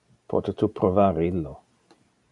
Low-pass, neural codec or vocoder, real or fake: 10.8 kHz; none; real